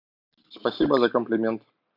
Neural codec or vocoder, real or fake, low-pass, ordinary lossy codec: none; real; 5.4 kHz; AAC, 48 kbps